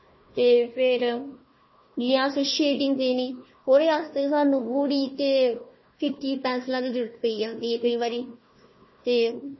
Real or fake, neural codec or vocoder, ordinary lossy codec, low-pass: fake; codec, 16 kHz, 1 kbps, FunCodec, trained on Chinese and English, 50 frames a second; MP3, 24 kbps; 7.2 kHz